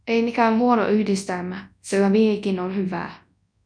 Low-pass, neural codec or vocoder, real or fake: 9.9 kHz; codec, 24 kHz, 0.9 kbps, WavTokenizer, large speech release; fake